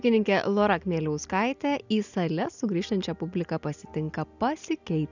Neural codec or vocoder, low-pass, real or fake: none; 7.2 kHz; real